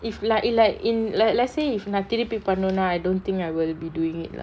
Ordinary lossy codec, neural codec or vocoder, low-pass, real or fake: none; none; none; real